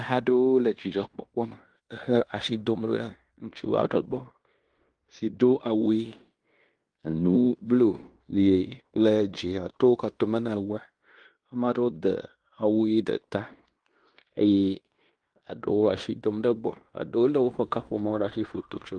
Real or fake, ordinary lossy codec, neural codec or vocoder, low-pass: fake; Opus, 32 kbps; codec, 16 kHz in and 24 kHz out, 0.9 kbps, LongCat-Audio-Codec, fine tuned four codebook decoder; 9.9 kHz